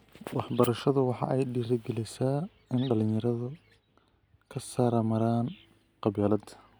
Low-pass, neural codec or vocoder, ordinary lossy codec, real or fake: none; none; none; real